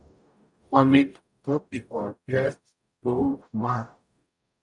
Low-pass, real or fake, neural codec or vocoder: 10.8 kHz; fake; codec, 44.1 kHz, 0.9 kbps, DAC